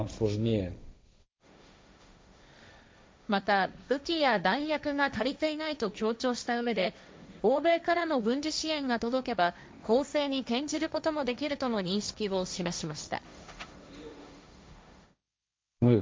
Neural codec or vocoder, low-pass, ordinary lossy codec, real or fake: codec, 16 kHz, 1.1 kbps, Voila-Tokenizer; none; none; fake